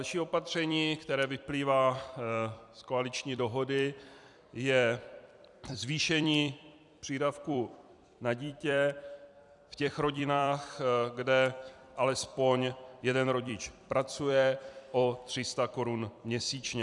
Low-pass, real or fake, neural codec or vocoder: 10.8 kHz; fake; vocoder, 44.1 kHz, 128 mel bands every 256 samples, BigVGAN v2